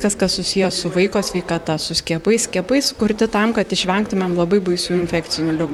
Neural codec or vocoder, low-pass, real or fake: vocoder, 44.1 kHz, 128 mel bands, Pupu-Vocoder; 19.8 kHz; fake